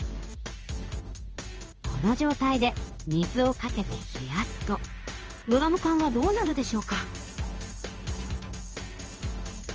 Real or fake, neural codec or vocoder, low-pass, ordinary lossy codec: fake; codec, 16 kHz in and 24 kHz out, 1 kbps, XY-Tokenizer; 7.2 kHz; Opus, 24 kbps